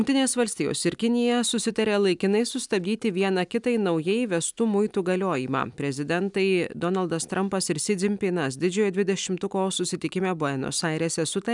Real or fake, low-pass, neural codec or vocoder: real; 10.8 kHz; none